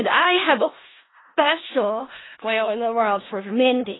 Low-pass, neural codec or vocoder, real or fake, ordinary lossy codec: 7.2 kHz; codec, 16 kHz in and 24 kHz out, 0.4 kbps, LongCat-Audio-Codec, four codebook decoder; fake; AAC, 16 kbps